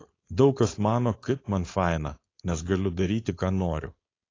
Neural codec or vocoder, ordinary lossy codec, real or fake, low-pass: codec, 16 kHz, 4.8 kbps, FACodec; AAC, 32 kbps; fake; 7.2 kHz